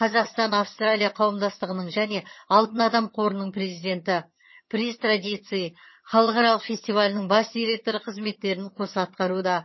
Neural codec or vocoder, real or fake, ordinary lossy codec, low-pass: vocoder, 22.05 kHz, 80 mel bands, HiFi-GAN; fake; MP3, 24 kbps; 7.2 kHz